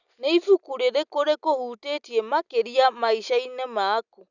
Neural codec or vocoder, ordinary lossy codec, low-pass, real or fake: none; none; 7.2 kHz; real